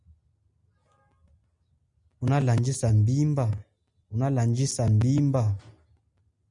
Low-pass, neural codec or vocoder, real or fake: 10.8 kHz; none; real